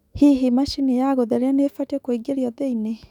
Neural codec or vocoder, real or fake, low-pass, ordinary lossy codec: autoencoder, 48 kHz, 128 numbers a frame, DAC-VAE, trained on Japanese speech; fake; 19.8 kHz; none